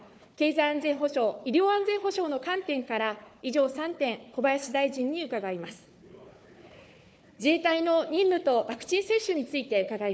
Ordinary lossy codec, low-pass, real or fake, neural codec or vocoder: none; none; fake; codec, 16 kHz, 4 kbps, FunCodec, trained on Chinese and English, 50 frames a second